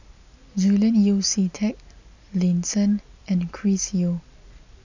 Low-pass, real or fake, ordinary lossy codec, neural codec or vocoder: 7.2 kHz; real; none; none